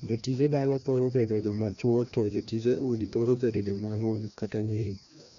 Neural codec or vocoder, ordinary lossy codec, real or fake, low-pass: codec, 16 kHz, 1 kbps, FreqCodec, larger model; none; fake; 7.2 kHz